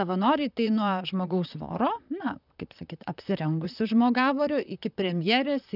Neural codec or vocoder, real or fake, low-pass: vocoder, 44.1 kHz, 128 mel bands, Pupu-Vocoder; fake; 5.4 kHz